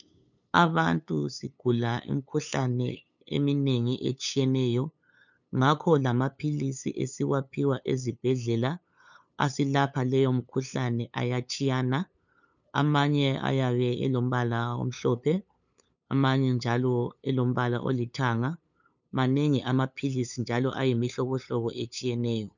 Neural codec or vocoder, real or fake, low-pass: codec, 16 kHz, 8 kbps, FunCodec, trained on LibriTTS, 25 frames a second; fake; 7.2 kHz